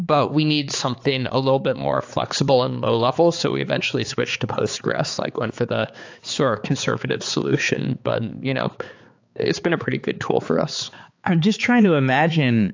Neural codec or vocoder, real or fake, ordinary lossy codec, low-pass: codec, 16 kHz, 4 kbps, X-Codec, HuBERT features, trained on balanced general audio; fake; AAC, 48 kbps; 7.2 kHz